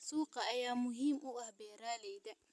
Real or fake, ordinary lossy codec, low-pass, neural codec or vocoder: real; none; none; none